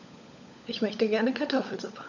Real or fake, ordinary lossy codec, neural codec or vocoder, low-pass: fake; none; codec, 16 kHz, 16 kbps, FunCodec, trained on LibriTTS, 50 frames a second; 7.2 kHz